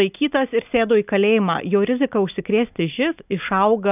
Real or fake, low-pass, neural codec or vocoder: real; 3.6 kHz; none